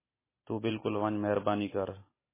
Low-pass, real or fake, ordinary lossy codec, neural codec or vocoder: 3.6 kHz; real; MP3, 16 kbps; none